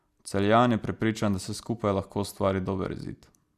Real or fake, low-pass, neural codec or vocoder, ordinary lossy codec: real; 14.4 kHz; none; Opus, 64 kbps